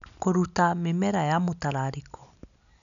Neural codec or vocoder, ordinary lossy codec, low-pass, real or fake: none; none; 7.2 kHz; real